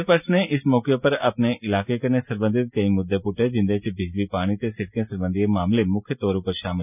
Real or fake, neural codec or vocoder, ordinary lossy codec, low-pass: real; none; none; 3.6 kHz